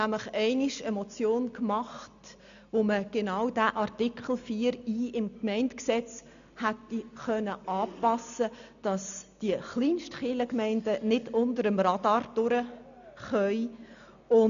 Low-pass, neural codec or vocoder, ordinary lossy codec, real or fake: 7.2 kHz; none; none; real